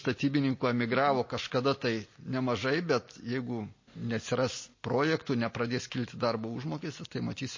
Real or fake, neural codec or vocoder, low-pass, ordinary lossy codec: real; none; 7.2 kHz; MP3, 32 kbps